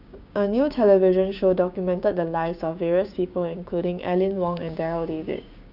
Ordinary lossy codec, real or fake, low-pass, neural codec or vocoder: none; fake; 5.4 kHz; codec, 16 kHz, 6 kbps, DAC